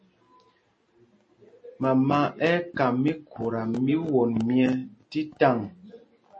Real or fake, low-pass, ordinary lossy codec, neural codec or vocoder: real; 9.9 kHz; MP3, 32 kbps; none